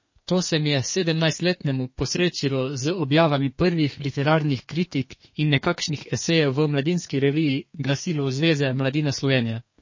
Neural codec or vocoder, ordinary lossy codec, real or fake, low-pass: codec, 32 kHz, 1.9 kbps, SNAC; MP3, 32 kbps; fake; 7.2 kHz